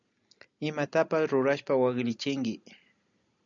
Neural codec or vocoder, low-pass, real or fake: none; 7.2 kHz; real